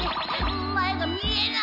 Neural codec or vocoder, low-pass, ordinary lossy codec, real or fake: none; 5.4 kHz; none; real